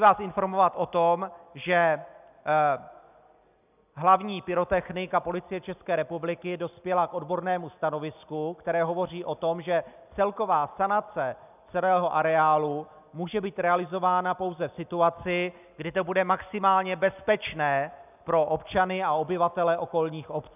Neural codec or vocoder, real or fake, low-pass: none; real; 3.6 kHz